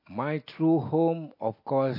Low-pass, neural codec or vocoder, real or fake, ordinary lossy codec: 5.4 kHz; none; real; MP3, 32 kbps